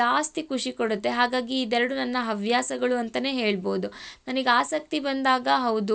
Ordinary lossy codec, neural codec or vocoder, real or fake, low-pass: none; none; real; none